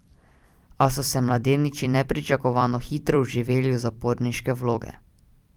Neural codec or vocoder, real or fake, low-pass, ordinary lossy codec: vocoder, 44.1 kHz, 128 mel bands every 256 samples, BigVGAN v2; fake; 19.8 kHz; Opus, 32 kbps